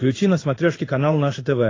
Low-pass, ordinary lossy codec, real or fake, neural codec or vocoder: 7.2 kHz; AAC, 32 kbps; fake; codec, 16 kHz in and 24 kHz out, 1 kbps, XY-Tokenizer